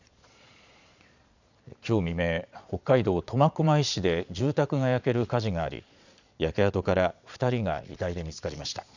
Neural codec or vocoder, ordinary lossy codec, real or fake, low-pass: vocoder, 22.05 kHz, 80 mel bands, Vocos; none; fake; 7.2 kHz